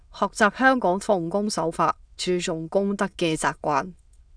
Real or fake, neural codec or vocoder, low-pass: fake; autoencoder, 22.05 kHz, a latent of 192 numbers a frame, VITS, trained on many speakers; 9.9 kHz